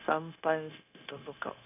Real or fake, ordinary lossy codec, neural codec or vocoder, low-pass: fake; none; codec, 16 kHz, 0.9 kbps, LongCat-Audio-Codec; 3.6 kHz